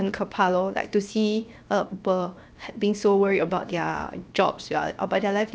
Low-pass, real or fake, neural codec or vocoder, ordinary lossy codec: none; fake; codec, 16 kHz, 0.7 kbps, FocalCodec; none